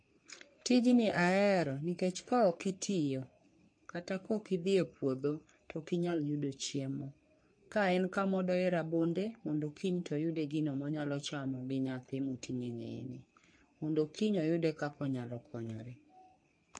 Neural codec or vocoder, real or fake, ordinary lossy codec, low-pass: codec, 44.1 kHz, 3.4 kbps, Pupu-Codec; fake; MP3, 48 kbps; 9.9 kHz